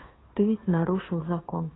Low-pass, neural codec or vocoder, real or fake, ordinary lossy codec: 7.2 kHz; codec, 16 kHz, 2 kbps, FunCodec, trained on Chinese and English, 25 frames a second; fake; AAC, 16 kbps